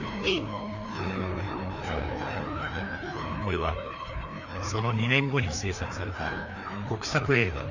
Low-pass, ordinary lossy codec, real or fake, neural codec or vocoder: 7.2 kHz; none; fake; codec, 16 kHz, 2 kbps, FreqCodec, larger model